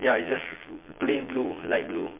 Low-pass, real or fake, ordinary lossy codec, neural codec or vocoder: 3.6 kHz; fake; MP3, 24 kbps; vocoder, 22.05 kHz, 80 mel bands, Vocos